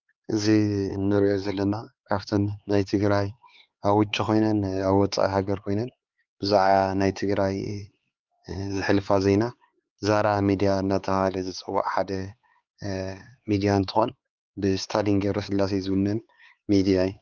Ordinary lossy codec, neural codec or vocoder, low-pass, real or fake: Opus, 32 kbps; codec, 16 kHz, 4 kbps, X-Codec, HuBERT features, trained on LibriSpeech; 7.2 kHz; fake